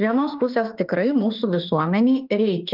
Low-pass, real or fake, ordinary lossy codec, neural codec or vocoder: 5.4 kHz; fake; Opus, 24 kbps; autoencoder, 48 kHz, 32 numbers a frame, DAC-VAE, trained on Japanese speech